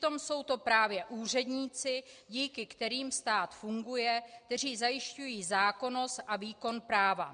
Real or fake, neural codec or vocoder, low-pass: real; none; 9.9 kHz